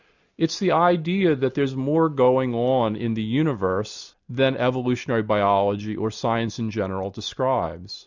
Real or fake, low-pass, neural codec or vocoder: real; 7.2 kHz; none